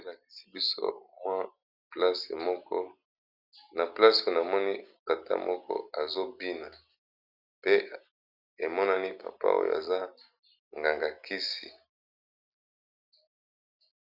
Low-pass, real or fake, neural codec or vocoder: 5.4 kHz; real; none